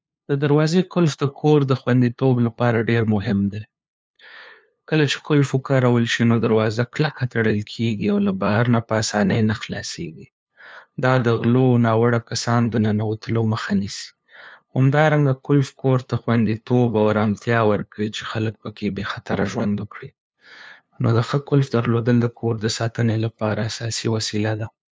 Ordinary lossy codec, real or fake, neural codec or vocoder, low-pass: none; fake; codec, 16 kHz, 2 kbps, FunCodec, trained on LibriTTS, 25 frames a second; none